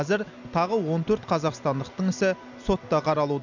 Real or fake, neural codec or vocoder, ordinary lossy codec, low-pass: real; none; none; 7.2 kHz